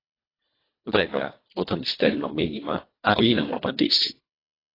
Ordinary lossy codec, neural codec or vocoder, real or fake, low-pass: AAC, 24 kbps; codec, 24 kHz, 1.5 kbps, HILCodec; fake; 5.4 kHz